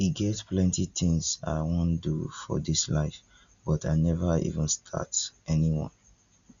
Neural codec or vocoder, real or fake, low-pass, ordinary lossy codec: none; real; 7.2 kHz; none